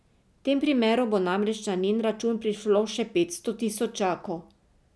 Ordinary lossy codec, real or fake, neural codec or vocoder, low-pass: none; real; none; none